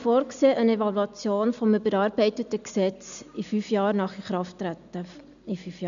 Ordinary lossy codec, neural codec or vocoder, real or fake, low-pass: none; none; real; 7.2 kHz